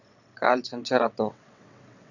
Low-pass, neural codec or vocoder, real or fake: 7.2 kHz; vocoder, 22.05 kHz, 80 mel bands, WaveNeXt; fake